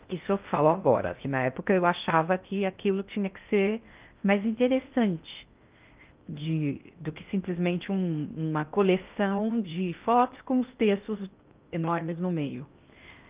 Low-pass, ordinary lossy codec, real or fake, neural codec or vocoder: 3.6 kHz; Opus, 24 kbps; fake; codec, 16 kHz in and 24 kHz out, 0.6 kbps, FocalCodec, streaming, 4096 codes